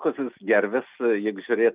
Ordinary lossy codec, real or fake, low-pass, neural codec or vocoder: Opus, 32 kbps; real; 3.6 kHz; none